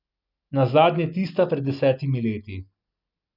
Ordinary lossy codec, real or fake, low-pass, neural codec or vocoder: none; real; 5.4 kHz; none